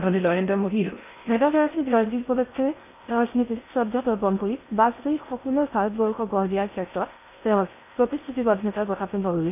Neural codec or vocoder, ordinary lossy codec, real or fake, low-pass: codec, 16 kHz in and 24 kHz out, 0.6 kbps, FocalCodec, streaming, 4096 codes; none; fake; 3.6 kHz